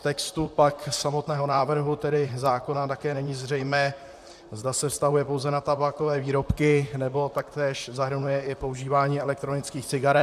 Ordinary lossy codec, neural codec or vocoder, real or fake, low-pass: MP3, 96 kbps; vocoder, 44.1 kHz, 128 mel bands, Pupu-Vocoder; fake; 14.4 kHz